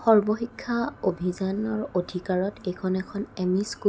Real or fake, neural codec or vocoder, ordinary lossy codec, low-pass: real; none; none; none